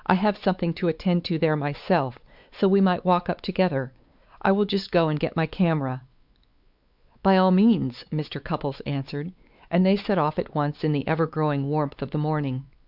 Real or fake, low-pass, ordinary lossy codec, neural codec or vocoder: fake; 5.4 kHz; Opus, 64 kbps; codec, 16 kHz, 4 kbps, X-Codec, WavLM features, trained on Multilingual LibriSpeech